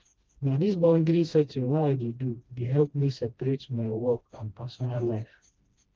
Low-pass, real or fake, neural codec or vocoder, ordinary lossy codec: 7.2 kHz; fake; codec, 16 kHz, 1 kbps, FreqCodec, smaller model; Opus, 24 kbps